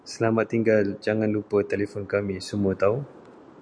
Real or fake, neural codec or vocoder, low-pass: real; none; 9.9 kHz